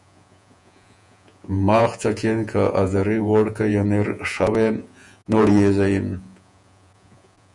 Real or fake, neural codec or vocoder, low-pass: fake; vocoder, 48 kHz, 128 mel bands, Vocos; 10.8 kHz